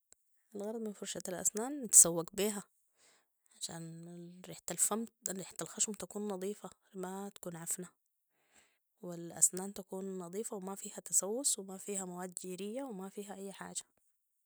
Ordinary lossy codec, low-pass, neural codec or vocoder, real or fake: none; none; none; real